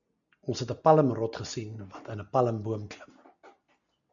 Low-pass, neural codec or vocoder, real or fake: 7.2 kHz; none; real